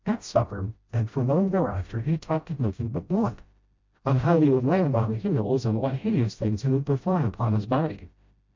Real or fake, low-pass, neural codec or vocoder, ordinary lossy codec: fake; 7.2 kHz; codec, 16 kHz, 0.5 kbps, FreqCodec, smaller model; MP3, 48 kbps